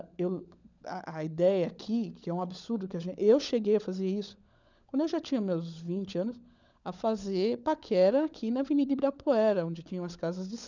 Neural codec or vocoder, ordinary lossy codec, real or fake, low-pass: codec, 16 kHz, 16 kbps, FunCodec, trained on LibriTTS, 50 frames a second; none; fake; 7.2 kHz